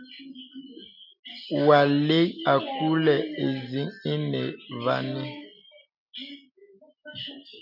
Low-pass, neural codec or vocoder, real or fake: 5.4 kHz; none; real